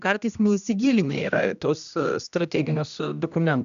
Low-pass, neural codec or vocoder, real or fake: 7.2 kHz; codec, 16 kHz, 1 kbps, X-Codec, HuBERT features, trained on general audio; fake